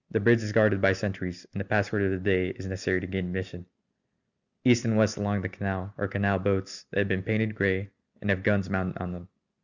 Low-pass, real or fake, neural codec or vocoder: 7.2 kHz; real; none